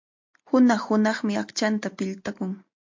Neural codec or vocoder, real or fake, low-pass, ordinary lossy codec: none; real; 7.2 kHz; MP3, 48 kbps